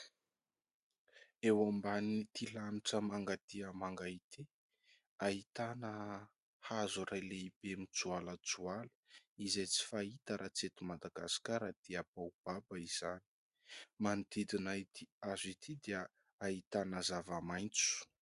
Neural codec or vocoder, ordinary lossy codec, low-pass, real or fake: none; Opus, 64 kbps; 10.8 kHz; real